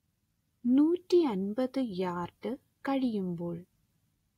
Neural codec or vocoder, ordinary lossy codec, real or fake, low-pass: none; AAC, 48 kbps; real; 19.8 kHz